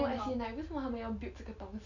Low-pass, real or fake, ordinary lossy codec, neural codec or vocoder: 7.2 kHz; real; none; none